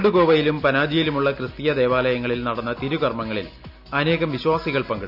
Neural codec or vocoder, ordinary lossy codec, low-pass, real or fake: none; none; 5.4 kHz; real